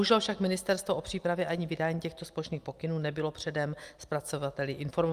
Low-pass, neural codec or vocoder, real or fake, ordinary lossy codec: 14.4 kHz; none; real; Opus, 32 kbps